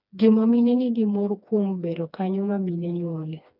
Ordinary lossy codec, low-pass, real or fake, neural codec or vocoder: none; 5.4 kHz; fake; codec, 16 kHz, 2 kbps, FreqCodec, smaller model